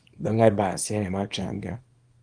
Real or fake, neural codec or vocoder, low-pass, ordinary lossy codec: fake; codec, 24 kHz, 0.9 kbps, WavTokenizer, small release; 9.9 kHz; Opus, 32 kbps